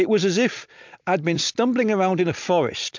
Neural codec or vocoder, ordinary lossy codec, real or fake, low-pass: vocoder, 44.1 kHz, 128 mel bands every 256 samples, BigVGAN v2; MP3, 64 kbps; fake; 7.2 kHz